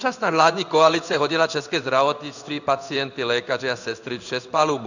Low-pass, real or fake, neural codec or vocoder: 7.2 kHz; fake; codec, 16 kHz in and 24 kHz out, 1 kbps, XY-Tokenizer